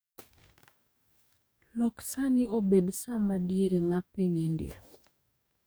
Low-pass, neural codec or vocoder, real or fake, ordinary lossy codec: none; codec, 44.1 kHz, 2.6 kbps, DAC; fake; none